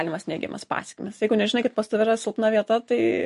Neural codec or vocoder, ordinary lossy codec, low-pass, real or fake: none; MP3, 48 kbps; 14.4 kHz; real